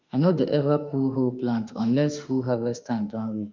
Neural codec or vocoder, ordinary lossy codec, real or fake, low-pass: autoencoder, 48 kHz, 32 numbers a frame, DAC-VAE, trained on Japanese speech; MP3, 64 kbps; fake; 7.2 kHz